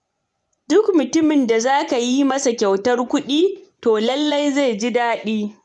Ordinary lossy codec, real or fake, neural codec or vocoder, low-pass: none; fake; vocoder, 24 kHz, 100 mel bands, Vocos; 10.8 kHz